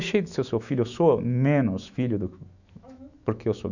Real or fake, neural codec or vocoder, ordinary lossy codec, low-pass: fake; autoencoder, 48 kHz, 128 numbers a frame, DAC-VAE, trained on Japanese speech; none; 7.2 kHz